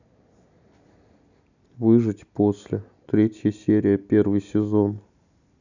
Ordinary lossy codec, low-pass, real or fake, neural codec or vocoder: none; 7.2 kHz; real; none